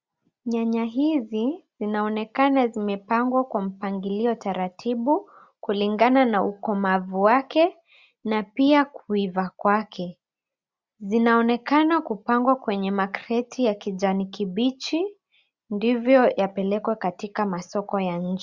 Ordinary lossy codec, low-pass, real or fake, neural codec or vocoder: Opus, 64 kbps; 7.2 kHz; real; none